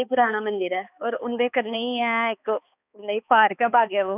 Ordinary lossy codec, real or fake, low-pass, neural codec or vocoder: none; fake; 3.6 kHz; codec, 16 kHz, 2 kbps, X-Codec, HuBERT features, trained on balanced general audio